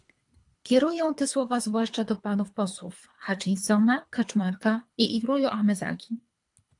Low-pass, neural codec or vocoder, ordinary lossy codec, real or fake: 10.8 kHz; codec, 24 kHz, 3 kbps, HILCodec; AAC, 64 kbps; fake